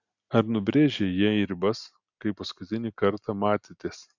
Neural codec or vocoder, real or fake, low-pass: none; real; 7.2 kHz